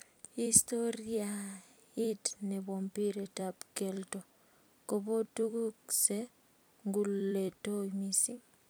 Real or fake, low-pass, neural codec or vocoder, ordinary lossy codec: fake; none; vocoder, 44.1 kHz, 128 mel bands every 256 samples, BigVGAN v2; none